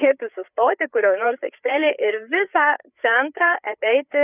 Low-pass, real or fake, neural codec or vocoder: 3.6 kHz; fake; codec, 16 kHz, 4 kbps, FreqCodec, larger model